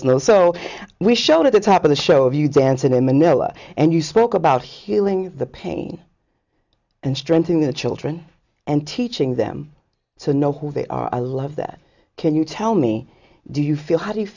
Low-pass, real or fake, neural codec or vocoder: 7.2 kHz; real; none